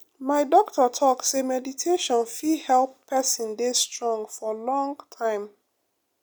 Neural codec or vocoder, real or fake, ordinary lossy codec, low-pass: none; real; none; none